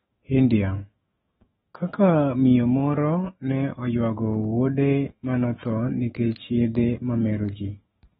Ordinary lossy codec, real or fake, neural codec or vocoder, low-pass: AAC, 16 kbps; fake; autoencoder, 48 kHz, 128 numbers a frame, DAC-VAE, trained on Japanese speech; 19.8 kHz